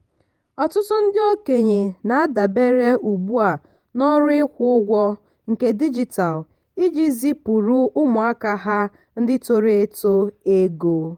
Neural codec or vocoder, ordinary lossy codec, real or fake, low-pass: vocoder, 48 kHz, 128 mel bands, Vocos; Opus, 32 kbps; fake; 19.8 kHz